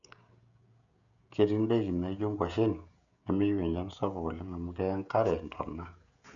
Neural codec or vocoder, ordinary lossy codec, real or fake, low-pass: codec, 16 kHz, 16 kbps, FreqCodec, smaller model; none; fake; 7.2 kHz